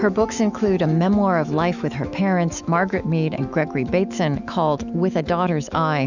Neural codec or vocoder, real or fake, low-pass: autoencoder, 48 kHz, 128 numbers a frame, DAC-VAE, trained on Japanese speech; fake; 7.2 kHz